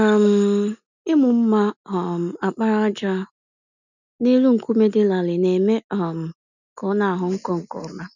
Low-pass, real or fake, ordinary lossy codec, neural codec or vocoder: 7.2 kHz; real; none; none